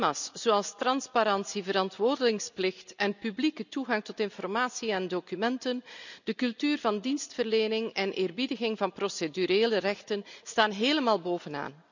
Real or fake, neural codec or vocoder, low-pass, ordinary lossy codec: real; none; 7.2 kHz; none